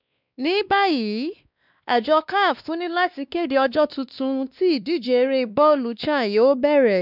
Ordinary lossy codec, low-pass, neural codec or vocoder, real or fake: none; 5.4 kHz; codec, 16 kHz, 2 kbps, X-Codec, WavLM features, trained on Multilingual LibriSpeech; fake